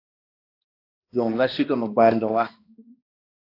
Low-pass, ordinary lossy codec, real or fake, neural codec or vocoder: 5.4 kHz; AAC, 32 kbps; fake; codec, 16 kHz, 1 kbps, X-Codec, HuBERT features, trained on balanced general audio